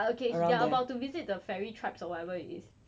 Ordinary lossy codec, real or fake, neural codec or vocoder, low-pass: none; real; none; none